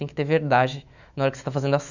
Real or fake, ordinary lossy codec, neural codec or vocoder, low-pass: fake; none; autoencoder, 48 kHz, 128 numbers a frame, DAC-VAE, trained on Japanese speech; 7.2 kHz